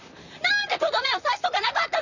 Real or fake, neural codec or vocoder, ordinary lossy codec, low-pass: fake; vocoder, 44.1 kHz, 128 mel bands, Pupu-Vocoder; none; 7.2 kHz